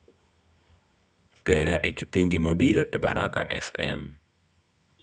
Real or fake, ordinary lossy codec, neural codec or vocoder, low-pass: fake; none; codec, 24 kHz, 0.9 kbps, WavTokenizer, medium music audio release; 10.8 kHz